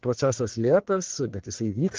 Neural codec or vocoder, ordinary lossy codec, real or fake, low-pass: codec, 44.1 kHz, 1.7 kbps, Pupu-Codec; Opus, 16 kbps; fake; 7.2 kHz